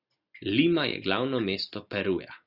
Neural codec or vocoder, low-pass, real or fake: none; 5.4 kHz; real